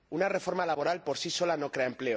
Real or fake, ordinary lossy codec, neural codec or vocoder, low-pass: real; none; none; none